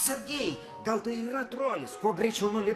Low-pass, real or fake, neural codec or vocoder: 14.4 kHz; fake; codec, 44.1 kHz, 2.6 kbps, SNAC